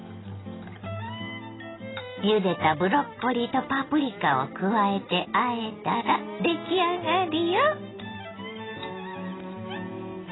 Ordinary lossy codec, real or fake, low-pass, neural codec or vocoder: AAC, 16 kbps; real; 7.2 kHz; none